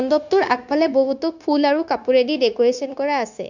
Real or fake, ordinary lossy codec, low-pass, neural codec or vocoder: fake; none; 7.2 kHz; codec, 16 kHz, 0.9 kbps, LongCat-Audio-Codec